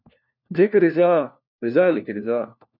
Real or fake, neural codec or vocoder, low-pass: fake; codec, 16 kHz, 1 kbps, FunCodec, trained on LibriTTS, 50 frames a second; 5.4 kHz